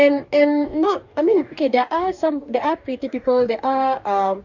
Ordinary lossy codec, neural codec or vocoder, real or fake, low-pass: none; codec, 44.1 kHz, 2.6 kbps, DAC; fake; 7.2 kHz